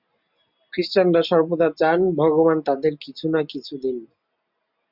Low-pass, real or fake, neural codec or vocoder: 5.4 kHz; real; none